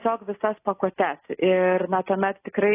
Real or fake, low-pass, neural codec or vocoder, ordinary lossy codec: real; 3.6 kHz; none; AAC, 16 kbps